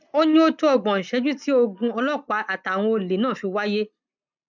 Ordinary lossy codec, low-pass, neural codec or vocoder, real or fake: none; 7.2 kHz; none; real